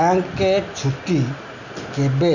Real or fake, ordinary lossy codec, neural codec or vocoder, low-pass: real; none; none; 7.2 kHz